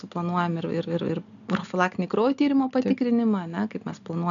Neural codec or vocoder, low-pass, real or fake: none; 7.2 kHz; real